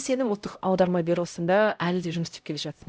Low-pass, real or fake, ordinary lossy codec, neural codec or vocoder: none; fake; none; codec, 16 kHz, 0.5 kbps, X-Codec, HuBERT features, trained on LibriSpeech